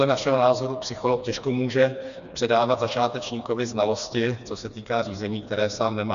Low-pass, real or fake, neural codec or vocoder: 7.2 kHz; fake; codec, 16 kHz, 2 kbps, FreqCodec, smaller model